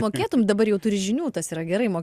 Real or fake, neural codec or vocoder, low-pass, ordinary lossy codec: real; none; 14.4 kHz; Opus, 64 kbps